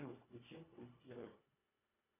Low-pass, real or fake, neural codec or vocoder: 3.6 kHz; fake; codec, 24 kHz, 1.5 kbps, HILCodec